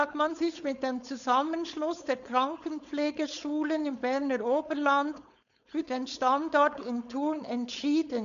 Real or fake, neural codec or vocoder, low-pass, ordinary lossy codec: fake; codec, 16 kHz, 4.8 kbps, FACodec; 7.2 kHz; none